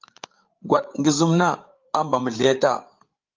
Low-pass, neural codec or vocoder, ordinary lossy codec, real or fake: 7.2 kHz; codec, 16 kHz, 8 kbps, FreqCodec, larger model; Opus, 24 kbps; fake